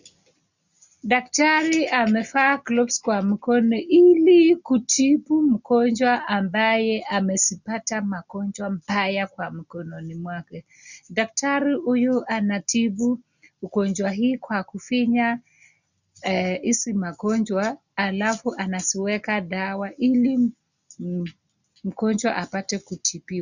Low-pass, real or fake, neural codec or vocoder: 7.2 kHz; real; none